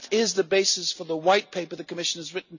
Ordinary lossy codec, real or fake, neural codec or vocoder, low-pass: none; real; none; 7.2 kHz